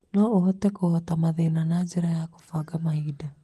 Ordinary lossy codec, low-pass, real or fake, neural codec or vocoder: Opus, 24 kbps; 14.4 kHz; real; none